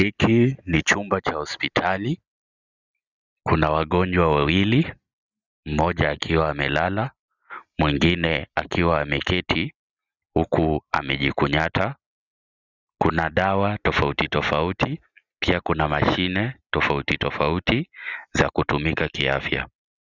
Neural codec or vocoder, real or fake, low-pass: none; real; 7.2 kHz